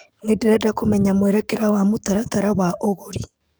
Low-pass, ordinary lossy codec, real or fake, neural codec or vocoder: none; none; fake; codec, 44.1 kHz, 7.8 kbps, DAC